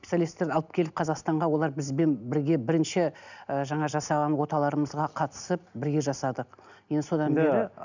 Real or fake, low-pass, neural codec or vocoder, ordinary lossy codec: real; 7.2 kHz; none; none